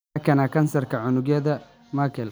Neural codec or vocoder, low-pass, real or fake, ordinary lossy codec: none; none; real; none